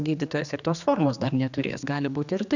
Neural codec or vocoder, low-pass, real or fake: codec, 16 kHz, 2 kbps, X-Codec, HuBERT features, trained on general audio; 7.2 kHz; fake